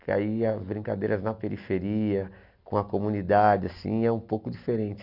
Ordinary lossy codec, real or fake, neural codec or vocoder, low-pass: none; real; none; 5.4 kHz